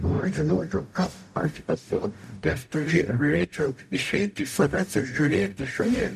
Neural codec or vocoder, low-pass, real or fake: codec, 44.1 kHz, 0.9 kbps, DAC; 14.4 kHz; fake